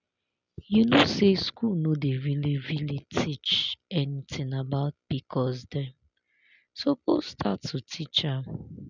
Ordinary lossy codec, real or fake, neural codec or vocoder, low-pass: none; real; none; 7.2 kHz